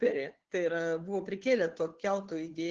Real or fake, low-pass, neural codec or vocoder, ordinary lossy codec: fake; 7.2 kHz; codec, 16 kHz, 2 kbps, FunCodec, trained on Chinese and English, 25 frames a second; Opus, 16 kbps